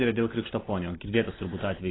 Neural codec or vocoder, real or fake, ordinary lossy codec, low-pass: none; real; AAC, 16 kbps; 7.2 kHz